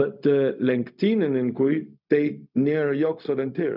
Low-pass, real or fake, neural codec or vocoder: 5.4 kHz; fake; codec, 16 kHz, 0.4 kbps, LongCat-Audio-Codec